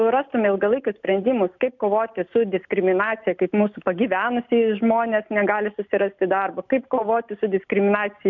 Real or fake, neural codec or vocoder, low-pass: real; none; 7.2 kHz